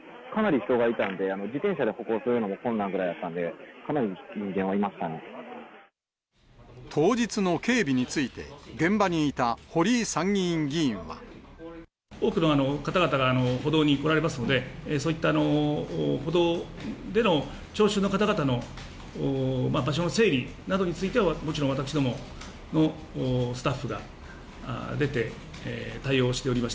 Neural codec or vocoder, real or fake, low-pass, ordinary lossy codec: none; real; none; none